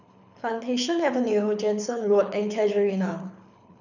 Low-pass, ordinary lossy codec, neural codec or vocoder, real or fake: 7.2 kHz; none; codec, 24 kHz, 6 kbps, HILCodec; fake